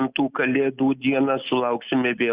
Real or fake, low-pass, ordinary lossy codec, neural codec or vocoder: real; 3.6 kHz; Opus, 64 kbps; none